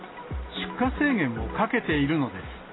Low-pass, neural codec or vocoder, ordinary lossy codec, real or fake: 7.2 kHz; none; AAC, 16 kbps; real